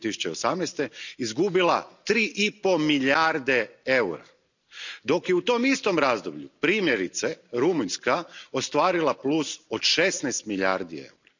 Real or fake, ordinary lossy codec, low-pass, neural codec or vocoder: real; none; 7.2 kHz; none